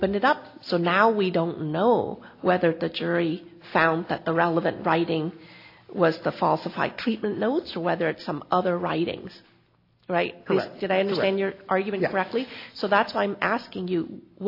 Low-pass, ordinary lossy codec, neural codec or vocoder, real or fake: 5.4 kHz; AAC, 32 kbps; none; real